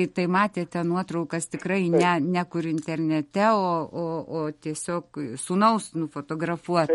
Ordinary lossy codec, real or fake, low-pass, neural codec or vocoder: MP3, 48 kbps; real; 19.8 kHz; none